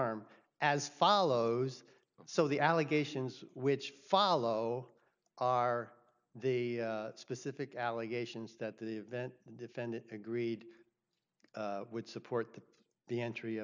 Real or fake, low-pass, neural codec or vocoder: real; 7.2 kHz; none